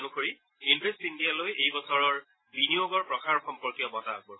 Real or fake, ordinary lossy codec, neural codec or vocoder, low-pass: real; AAC, 16 kbps; none; 7.2 kHz